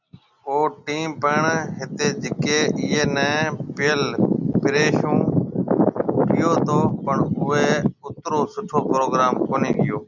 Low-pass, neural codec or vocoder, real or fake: 7.2 kHz; none; real